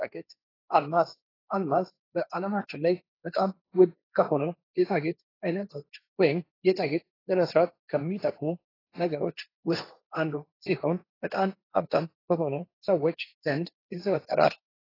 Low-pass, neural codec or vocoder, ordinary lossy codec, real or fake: 5.4 kHz; codec, 16 kHz, 1.1 kbps, Voila-Tokenizer; AAC, 24 kbps; fake